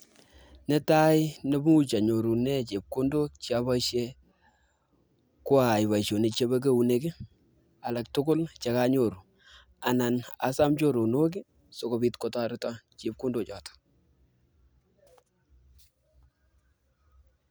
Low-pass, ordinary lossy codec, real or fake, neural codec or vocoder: none; none; real; none